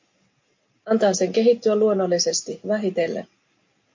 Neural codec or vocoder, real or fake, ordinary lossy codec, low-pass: none; real; MP3, 48 kbps; 7.2 kHz